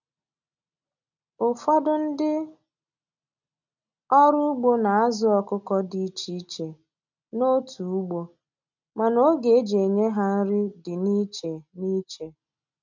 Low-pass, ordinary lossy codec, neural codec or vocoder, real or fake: 7.2 kHz; none; none; real